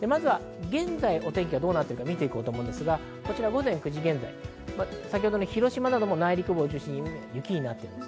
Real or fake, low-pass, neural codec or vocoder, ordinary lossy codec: real; none; none; none